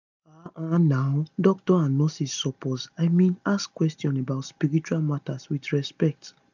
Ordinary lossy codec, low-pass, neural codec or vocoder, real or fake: none; 7.2 kHz; none; real